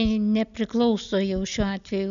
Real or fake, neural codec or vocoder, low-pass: real; none; 7.2 kHz